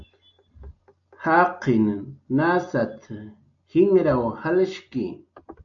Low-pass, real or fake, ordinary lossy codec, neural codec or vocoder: 7.2 kHz; real; AAC, 64 kbps; none